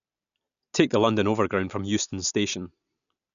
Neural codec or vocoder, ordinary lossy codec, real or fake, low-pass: none; none; real; 7.2 kHz